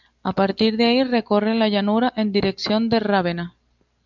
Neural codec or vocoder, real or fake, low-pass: none; real; 7.2 kHz